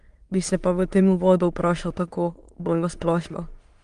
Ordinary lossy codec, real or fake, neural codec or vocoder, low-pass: Opus, 24 kbps; fake; autoencoder, 22.05 kHz, a latent of 192 numbers a frame, VITS, trained on many speakers; 9.9 kHz